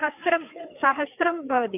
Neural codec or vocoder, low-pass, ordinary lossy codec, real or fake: codec, 16 kHz, 4 kbps, FreqCodec, larger model; 3.6 kHz; none; fake